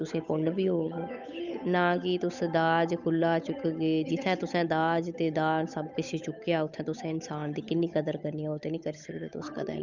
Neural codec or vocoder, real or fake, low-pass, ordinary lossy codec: codec, 16 kHz, 8 kbps, FunCodec, trained on Chinese and English, 25 frames a second; fake; 7.2 kHz; none